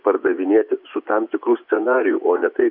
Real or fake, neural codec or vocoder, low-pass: fake; vocoder, 24 kHz, 100 mel bands, Vocos; 5.4 kHz